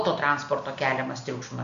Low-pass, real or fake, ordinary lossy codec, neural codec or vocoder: 7.2 kHz; real; AAC, 64 kbps; none